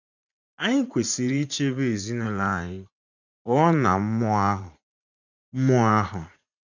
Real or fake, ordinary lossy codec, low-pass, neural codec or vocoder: fake; none; 7.2 kHz; vocoder, 44.1 kHz, 80 mel bands, Vocos